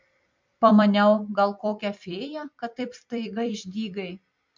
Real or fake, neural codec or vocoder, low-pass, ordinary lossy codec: fake; vocoder, 44.1 kHz, 128 mel bands every 256 samples, BigVGAN v2; 7.2 kHz; MP3, 64 kbps